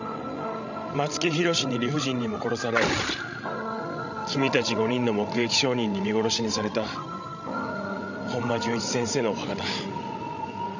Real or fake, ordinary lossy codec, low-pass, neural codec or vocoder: fake; none; 7.2 kHz; codec, 16 kHz, 16 kbps, FreqCodec, larger model